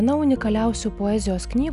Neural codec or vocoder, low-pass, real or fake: none; 10.8 kHz; real